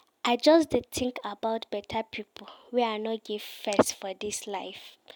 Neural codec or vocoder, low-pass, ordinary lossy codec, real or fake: none; none; none; real